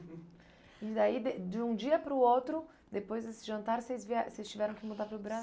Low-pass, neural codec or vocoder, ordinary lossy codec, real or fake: none; none; none; real